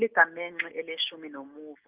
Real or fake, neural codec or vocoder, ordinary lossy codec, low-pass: real; none; Opus, 24 kbps; 3.6 kHz